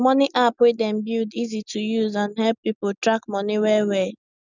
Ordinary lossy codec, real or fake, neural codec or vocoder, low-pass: none; real; none; 7.2 kHz